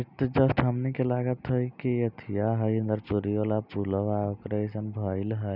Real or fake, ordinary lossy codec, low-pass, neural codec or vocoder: real; none; 5.4 kHz; none